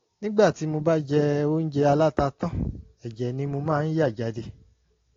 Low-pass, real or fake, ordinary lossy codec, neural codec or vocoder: 7.2 kHz; real; AAC, 32 kbps; none